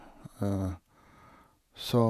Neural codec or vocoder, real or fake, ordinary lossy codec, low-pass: vocoder, 48 kHz, 128 mel bands, Vocos; fake; none; 14.4 kHz